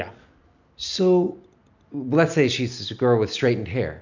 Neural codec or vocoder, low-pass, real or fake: none; 7.2 kHz; real